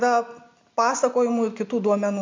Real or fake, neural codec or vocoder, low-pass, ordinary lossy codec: real; none; 7.2 kHz; MP3, 64 kbps